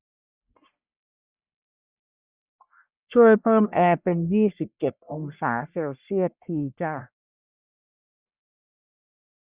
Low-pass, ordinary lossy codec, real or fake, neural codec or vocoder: 3.6 kHz; Opus, 64 kbps; fake; codec, 44.1 kHz, 1.7 kbps, Pupu-Codec